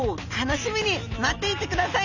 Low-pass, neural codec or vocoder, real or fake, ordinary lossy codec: 7.2 kHz; none; real; none